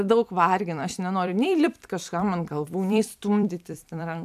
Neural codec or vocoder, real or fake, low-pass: vocoder, 44.1 kHz, 128 mel bands every 256 samples, BigVGAN v2; fake; 14.4 kHz